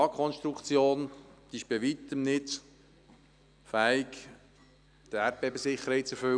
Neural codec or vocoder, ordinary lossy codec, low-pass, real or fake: none; none; 9.9 kHz; real